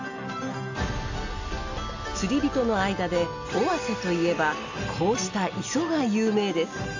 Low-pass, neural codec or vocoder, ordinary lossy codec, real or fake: 7.2 kHz; none; AAC, 48 kbps; real